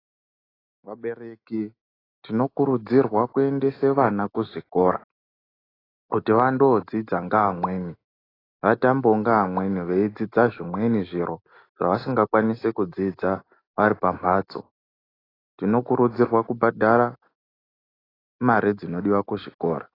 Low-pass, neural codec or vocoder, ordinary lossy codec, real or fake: 5.4 kHz; none; AAC, 24 kbps; real